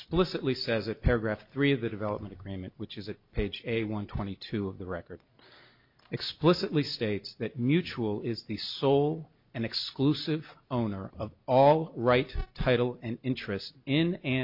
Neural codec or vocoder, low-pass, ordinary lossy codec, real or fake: none; 5.4 kHz; MP3, 48 kbps; real